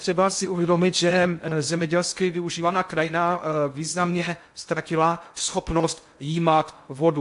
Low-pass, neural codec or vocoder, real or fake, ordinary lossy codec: 10.8 kHz; codec, 16 kHz in and 24 kHz out, 0.6 kbps, FocalCodec, streaming, 2048 codes; fake; AAC, 64 kbps